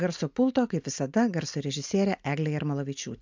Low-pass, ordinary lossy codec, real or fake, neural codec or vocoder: 7.2 kHz; MP3, 64 kbps; real; none